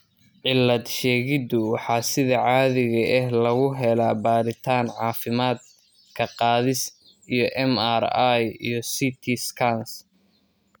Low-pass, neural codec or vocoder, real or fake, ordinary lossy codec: none; none; real; none